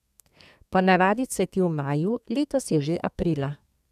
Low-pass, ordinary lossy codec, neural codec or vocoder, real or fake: 14.4 kHz; none; codec, 32 kHz, 1.9 kbps, SNAC; fake